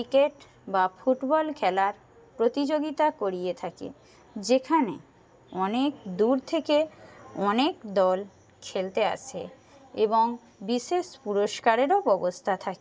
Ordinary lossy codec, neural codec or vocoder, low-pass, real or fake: none; none; none; real